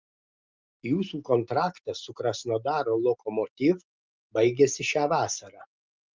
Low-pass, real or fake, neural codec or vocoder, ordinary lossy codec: 7.2 kHz; real; none; Opus, 24 kbps